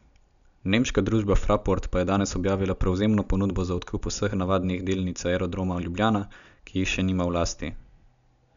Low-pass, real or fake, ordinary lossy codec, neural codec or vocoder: 7.2 kHz; real; none; none